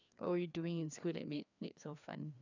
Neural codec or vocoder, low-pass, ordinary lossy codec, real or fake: codec, 16 kHz, 2 kbps, FreqCodec, larger model; 7.2 kHz; none; fake